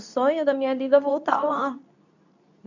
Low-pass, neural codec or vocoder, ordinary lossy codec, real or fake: 7.2 kHz; codec, 24 kHz, 0.9 kbps, WavTokenizer, medium speech release version 2; none; fake